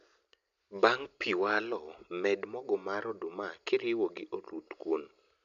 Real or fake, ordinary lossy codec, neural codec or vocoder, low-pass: real; none; none; 7.2 kHz